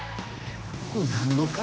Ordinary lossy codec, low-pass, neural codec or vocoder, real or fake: none; none; codec, 16 kHz, 2 kbps, X-Codec, HuBERT features, trained on general audio; fake